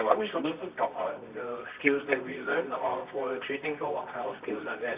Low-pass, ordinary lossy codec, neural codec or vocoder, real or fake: 3.6 kHz; Opus, 64 kbps; codec, 24 kHz, 0.9 kbps, WavTokenizer, medium music audio release; fake